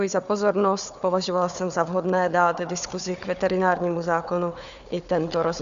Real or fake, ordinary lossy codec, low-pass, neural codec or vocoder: fake; Opus, 64 kbps; 7.2 kHz; codec, 16 kHz, 4 kbps, FunCodec, trained on Chinese and English, 50 frames a second